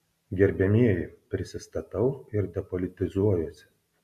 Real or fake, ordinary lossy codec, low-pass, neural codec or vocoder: real; AAC, 96 kbps; 14.4 kHz; none